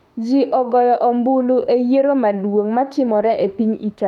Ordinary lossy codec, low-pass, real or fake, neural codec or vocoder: none; 19.8 kHz; fake; autoencoder, 48 kHz, 32 numbers a frame, DAC-VAE, trained on Japanese speech